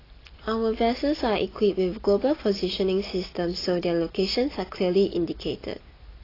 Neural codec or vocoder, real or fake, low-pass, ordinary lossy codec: none; real; 5.4 kHz; AAC, 24 kbps